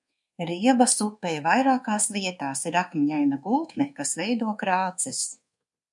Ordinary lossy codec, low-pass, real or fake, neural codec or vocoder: MP3, 64 kbps; 10.8 kHz; fake; codec, 24 kHz, 1.2 kbps, DualCodec